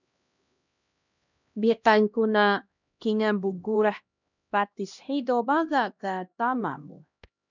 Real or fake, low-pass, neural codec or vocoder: fake; 7.2 kHz; codec, 16 kHz, 1 kbps, X-Codec, HuBERT features, trained on LibriSpeech